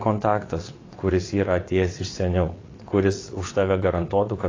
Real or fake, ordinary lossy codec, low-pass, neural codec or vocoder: fake; AAC, 32 kbps; 7.2 kHz; vocoder, 22.05 kHz, 80 mel bands, WaveNeXt